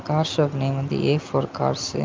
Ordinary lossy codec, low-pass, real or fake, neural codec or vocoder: Opus, 24 kbps; 7.2 kHz; real; none